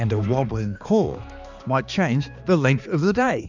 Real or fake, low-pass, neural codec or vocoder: fake; 7.2 kHz; codec, 16 kHz, 2 kbps, X-Codec, HuBERT features, trained on balanced general audio